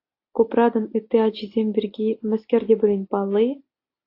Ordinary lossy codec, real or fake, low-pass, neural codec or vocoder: AAC, 32 kbps; real; 5.4 kHz; none